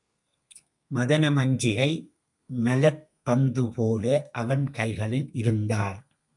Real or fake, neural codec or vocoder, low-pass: fake; codec, 32 kHz, 1.9 kbps, SNAC; 10.8 kHz